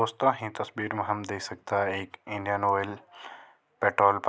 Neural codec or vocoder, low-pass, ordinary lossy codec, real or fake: none; none; none; real